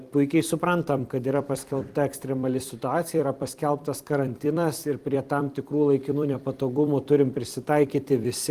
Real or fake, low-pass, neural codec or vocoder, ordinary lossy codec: fake; 14.4 kHz; vocoder, 44.1 kHz, 128 mel bands every 256 samples, BigVGAN v2; Opus, 24 kbps